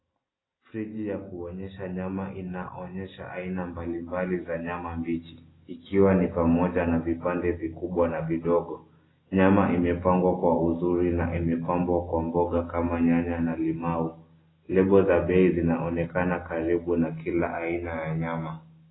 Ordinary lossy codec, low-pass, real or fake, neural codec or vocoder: AAC, 16 kbps; 7.2 kHz; real; none